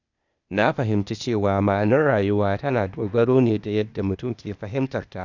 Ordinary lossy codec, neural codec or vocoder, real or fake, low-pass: none; codec, 16 kHz, 0.8 kbps, ZipCodec; fake; 7.2 kHz